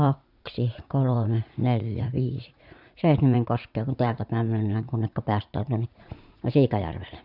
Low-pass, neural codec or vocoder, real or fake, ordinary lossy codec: 5.4 kHz; vocoder, 44.1 kHz, 80 mel bands, Vocos; fake; none